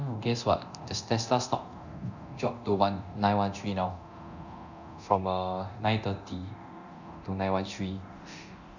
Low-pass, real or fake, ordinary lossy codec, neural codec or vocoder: 7.2 kHz; fake; none; codec, 24 kHz, 0.9 kbps, DualCodec